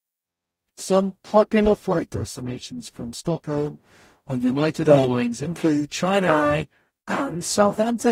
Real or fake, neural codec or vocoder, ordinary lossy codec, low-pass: fake; codec, 44.1 kHz, 0.9 kbps, DAC; AAC, 48 kbps; 19.8 kHz